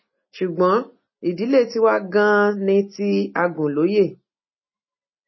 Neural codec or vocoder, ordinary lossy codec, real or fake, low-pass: none; MP3, 24 kbps; real; 7.2 kHz